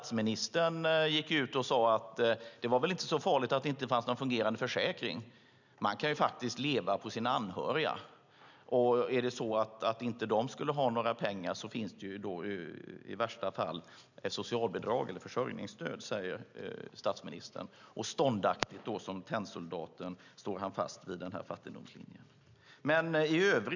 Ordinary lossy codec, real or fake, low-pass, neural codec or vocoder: none; real; 7.2 kHz; none